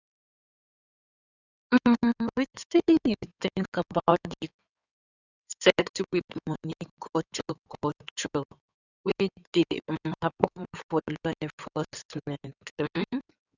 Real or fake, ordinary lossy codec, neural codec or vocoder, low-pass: fake; none; codec, 16 kHz in and 24 kHz out, 2.2 kbps, FireRedTTS-2 codec; 7.2 kHz